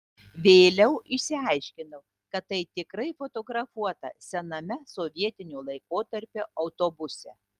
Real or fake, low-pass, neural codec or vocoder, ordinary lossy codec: real; 14.4 kHz; none; Opus, 24 kbps